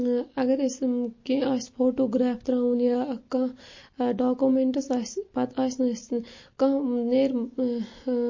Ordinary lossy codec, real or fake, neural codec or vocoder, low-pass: MP3, 32 kbps; real; none; 7.2 kHz